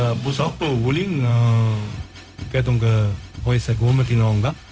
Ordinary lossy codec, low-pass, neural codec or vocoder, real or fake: none; none; codec, 16 kHz, 0.4 kbps, LongCat-Audio-Codec; fake